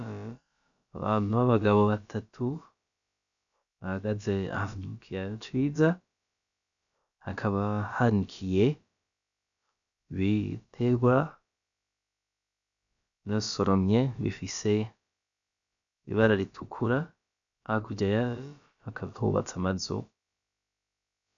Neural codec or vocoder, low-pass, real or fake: codec, 16 kHz, about 1 kbps, DyCAST, with the encoder's durations; 7.2 kHz; fake